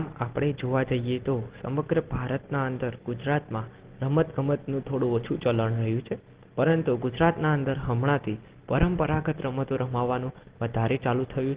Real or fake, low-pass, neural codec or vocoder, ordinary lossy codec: real; 3.6 kHz; none; Opus, 16 kbps